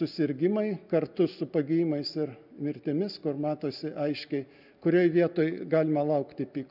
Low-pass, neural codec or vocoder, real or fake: 5.4 kHz; none; real